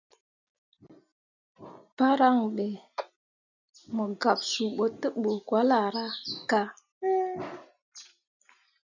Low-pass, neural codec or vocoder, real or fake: 7.2 kHz; none; real